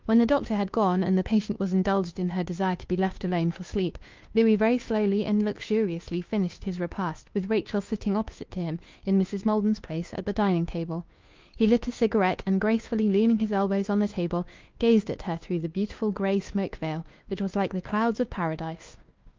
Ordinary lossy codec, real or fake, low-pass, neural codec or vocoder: Opus, 24 kbps; fake; 7.2 kHz; codec, 16 kHz, 2 kbps, FunCodec, trained on Chinese and English, 25 frames a second